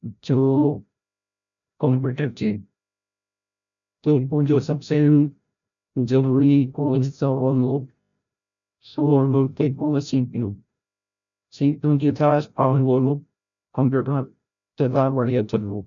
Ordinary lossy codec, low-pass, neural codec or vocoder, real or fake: none; 7.2 kHz; codec, 16 kHz, 0.5 kbps, FreqCodec, larger model; fake